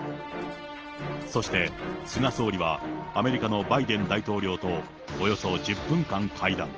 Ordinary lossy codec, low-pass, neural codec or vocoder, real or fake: Opus, 16 kbps; 7.2 kHz; none; real